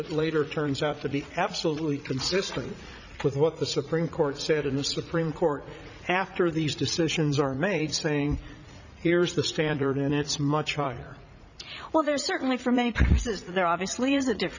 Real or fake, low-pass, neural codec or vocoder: fake; 7.2 kHz; vocoder, 44.1 kHz, 80 mel bands, Vocos